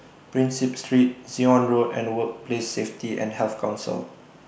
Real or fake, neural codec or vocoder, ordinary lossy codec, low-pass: real; none; none; none